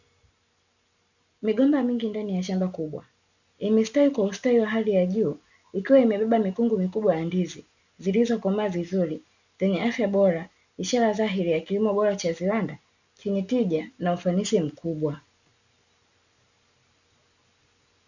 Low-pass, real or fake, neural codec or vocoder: 7.2 kHz; real; none